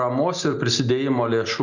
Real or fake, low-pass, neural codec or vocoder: real; 7.2 kHz; none